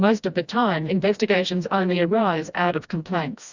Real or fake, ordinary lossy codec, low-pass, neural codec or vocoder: fake; Opus, 64 kbps; 7.2 kHz; codec, 16 kHz, 1 kbps, FreqCodec, smaller model